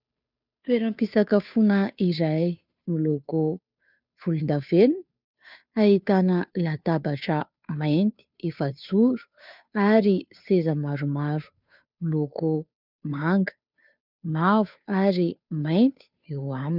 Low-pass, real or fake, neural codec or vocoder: 5.4 kHz; fake; codec, 16 kHz, 2 kbps, FunCodec, trained on Chinese and English, 25 frames a second